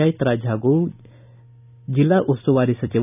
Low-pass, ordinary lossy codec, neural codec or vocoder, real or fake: 3.6 kHz; none; none; real